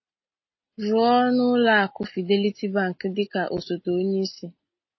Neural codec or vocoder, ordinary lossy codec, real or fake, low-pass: none; MP3, 24 kbps; real; 7.2 kHz